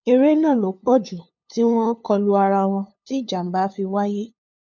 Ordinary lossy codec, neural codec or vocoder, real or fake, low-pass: none; codec, 16 kHz, 2 kbps, FunCodec, trained on LibriTTS, 25 frames a second; fake; 7.2 kHz